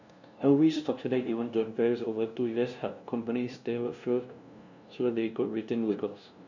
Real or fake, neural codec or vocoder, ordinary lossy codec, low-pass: fake; codec, 16 kHz, 0.5 kbps, FunCodec, trained on LibriTTS, 25 frames a second; none; 7.2 kHz